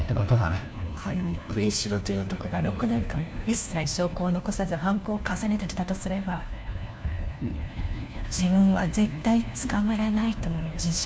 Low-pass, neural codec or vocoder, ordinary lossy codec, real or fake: none; codec, 16 kHz, 1 kbps, FunCodec, trained on LibriTTS, 50 frames a second; none; fake